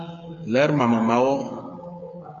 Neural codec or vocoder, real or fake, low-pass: codec, 16 kHz, 8 kbps, FreqCodec, smaller model; fake; 7.2 kHz